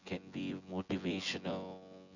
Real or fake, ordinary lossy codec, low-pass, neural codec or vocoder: fake; none; 7.2 kHz; vocoder, 24 kHz, 100 mel bands, Vocos